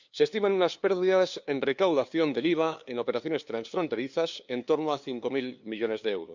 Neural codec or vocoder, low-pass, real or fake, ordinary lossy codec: codec, 16 kHz, 2 kbps, FunCodec, trained on LibriTTS, 25 frames a second; 7.2 kHz; fake; Opus, 64 kbps